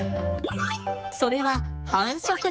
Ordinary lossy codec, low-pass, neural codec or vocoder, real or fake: none; none; codec, 16 kHz, 4 kbps, X-Codec, HuBERT features, trained on general audio; fake